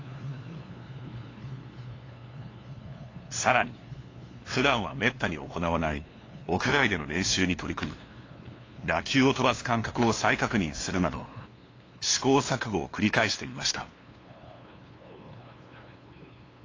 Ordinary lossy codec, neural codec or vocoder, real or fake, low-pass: AAC, 32 kbps; codec, 16 kHz, 2 kbps, FunCodec, trained on LibriTTS, 25 frames a second; fake; 7.2 kHz